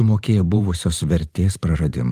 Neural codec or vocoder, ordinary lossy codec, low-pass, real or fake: vocoder, 44.1 kHz, 128 mel bands every 512 samples, BigVGAN v2; Opus, 24 kbps; 14.4 kHz; fake